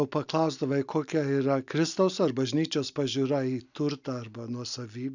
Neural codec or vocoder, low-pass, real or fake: none; 7.2 kHz; real